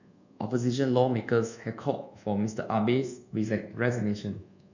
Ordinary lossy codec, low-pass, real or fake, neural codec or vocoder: none; 7.2 kHz; fake; codec, 24 kHz, 1.2 kbps, DualCodec